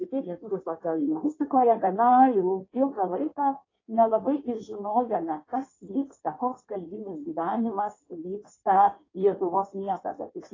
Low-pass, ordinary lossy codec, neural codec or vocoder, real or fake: 7.2 kHz; AAC, 32 kbps; codec, 16 kHz in and 24 kHz out, 1.1 kbps, FireRedTTS-2 codec; fake